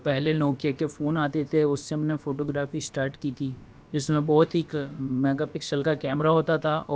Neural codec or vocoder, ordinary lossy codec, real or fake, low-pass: codec, 16 kHz, about 1 kbps, DyCAST, with the encoder's durations; none; fake; none